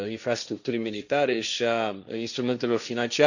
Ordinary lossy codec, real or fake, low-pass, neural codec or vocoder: none; fake; 7.2 kHz; codec, 16 kHz, 1.1 kbps, Voila-Tokenizer